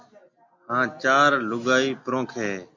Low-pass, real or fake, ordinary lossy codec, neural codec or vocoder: 7.2 kHz; real; MP3, 64 kbps; none